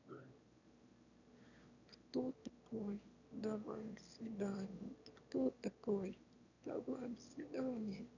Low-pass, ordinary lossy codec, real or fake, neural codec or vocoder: 7.2 kHz; none; fake; autoencoder, 22.05 kHz, a latent of 192 numbers a frame, VITS, trained on one speaker